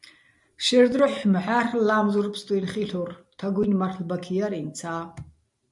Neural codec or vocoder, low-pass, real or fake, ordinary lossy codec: none; 10.8 kHz; real; MP3, 96 kbps